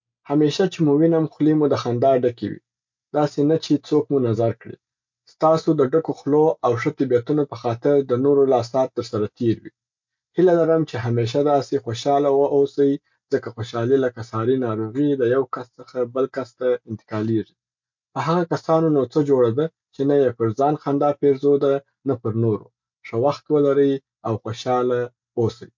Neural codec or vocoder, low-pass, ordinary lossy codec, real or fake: none; 7.2 kHz; MP3, 48 kbps; real